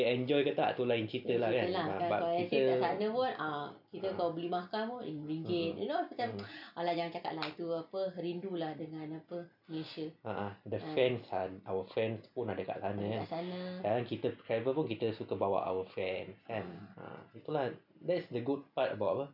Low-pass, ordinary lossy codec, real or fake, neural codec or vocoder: 5.4 kHz; none; real; none